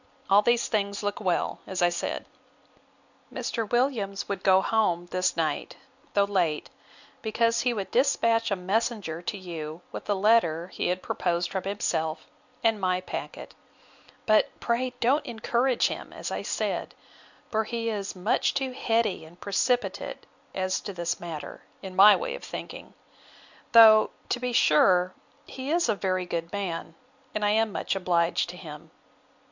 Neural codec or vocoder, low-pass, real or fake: none; 7.2 kHz; real